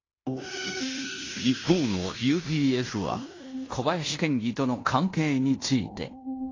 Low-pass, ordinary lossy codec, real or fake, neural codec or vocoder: 7.2 kHz; AAC, 32 kbps; fake; codec, 16 kHz in and 24 kHz out, 0.9 kbps, LongCat-Audio-Codec, fine tuned four codebook decoder